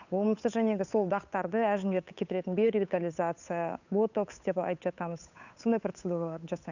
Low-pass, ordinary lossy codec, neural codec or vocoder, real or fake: 7.2 kHz; none; codec, 16 kHz, 8 kbps, FunCodec, trained on Chinese and English, 25 frames a second; fake